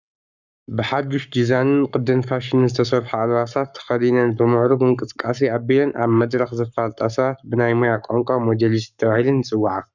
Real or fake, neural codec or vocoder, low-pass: fake; codec, 44.1 kHz, 7.8 kbps, Pupu-Codec; 7.2 kHz